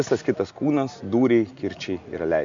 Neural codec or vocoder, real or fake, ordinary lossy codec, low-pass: none; real; MP3, 64 kbps; 7.2 kHz